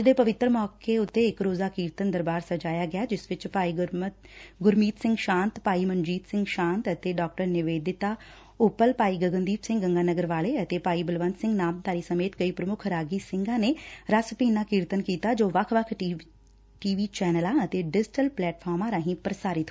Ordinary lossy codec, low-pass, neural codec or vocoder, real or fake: none; none; none; real